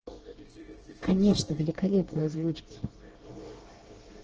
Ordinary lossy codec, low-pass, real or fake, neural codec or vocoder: Opus, 16 kbps; 7.2 kHz; fake; codec, 24 kHz, 1 kbps, SNAC